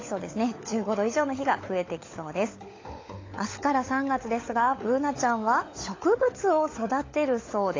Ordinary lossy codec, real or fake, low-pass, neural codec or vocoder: AAC, 32 kbps; fake; 7.2 kHz; codec, 16 kHz, 16 kbps, FunCodec, trained on LibriTTS, 50 frames a second